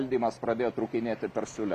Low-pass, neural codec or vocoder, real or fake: 14.4 kHz; none; real